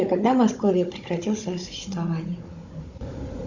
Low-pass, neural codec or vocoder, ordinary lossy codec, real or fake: 7.2 kHz; codec, 16 kHz, 16 kbps, FunCodec, trained on Chinese and English, 50 frames a second; Opus, 64 kbps; fake